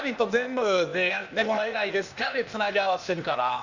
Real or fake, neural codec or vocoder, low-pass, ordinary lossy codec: fake; codec, 16 kHz, 0.8 kbps, ZipCodec; 7.2 kHz; none